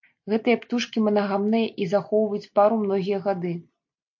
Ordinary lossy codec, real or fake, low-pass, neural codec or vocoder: MP3, 48 kbps; real; 7.2 kHz; none